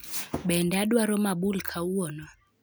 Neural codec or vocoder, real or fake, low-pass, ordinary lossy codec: none; real; none; none